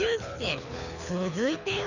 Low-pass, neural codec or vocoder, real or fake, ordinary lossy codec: 7.2 kHz; codec, 24 kHz, 6 kbps, HILCodec; fake; none